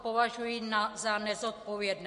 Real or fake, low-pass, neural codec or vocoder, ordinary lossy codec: real; 10.8 kHz; none; MP3, 48 kbps